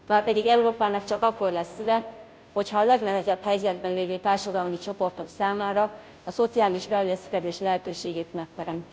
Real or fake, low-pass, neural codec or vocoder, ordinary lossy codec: fake; none; codec, 16 kHz, 0.5 kbps, FunCodec, trained on Chinese and English, 25 frames a second; none